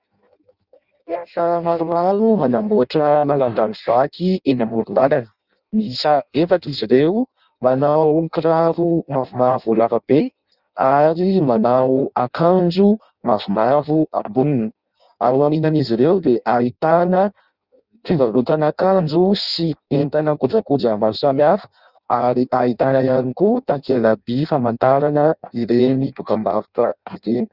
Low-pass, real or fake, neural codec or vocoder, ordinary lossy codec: 5.4 kHz; fake; codec, 16 kHz in and 24 kHz out, 0.6 kbps, FireRedTTS-2 codec; Opus, 64 kbps